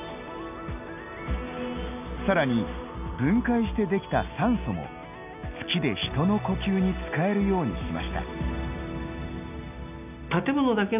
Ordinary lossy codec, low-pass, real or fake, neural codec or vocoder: none; 3.6 kHz; real; none